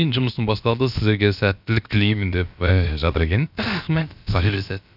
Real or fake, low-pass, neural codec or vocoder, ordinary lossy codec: fake; 5.4 kHz; codec, 16 kHz, about 1 kbps, DyCAST, with the encoder's durations; none